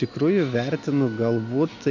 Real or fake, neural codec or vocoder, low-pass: real; none; 7.2 kHz